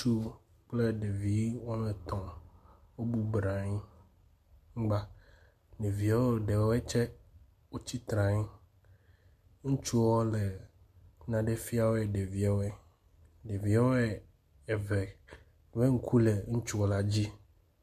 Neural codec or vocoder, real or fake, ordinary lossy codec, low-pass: none; real; MP3, 96 kbps; 14.4 kHz